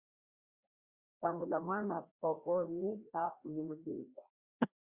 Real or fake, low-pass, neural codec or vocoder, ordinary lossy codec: fake; 3.6 kHz; codec, 16 kHz, 1 kbps, FreqCodec, larger model; Opus, 64 kbps